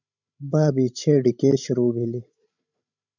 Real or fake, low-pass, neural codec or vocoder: fake; 7.2 kHz; codec, 16 kHz, 16 kbps, FreqCodec, larger model